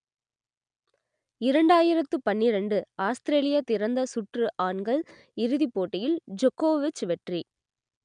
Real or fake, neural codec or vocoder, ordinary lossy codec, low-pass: real; none; none; 9.9 kHz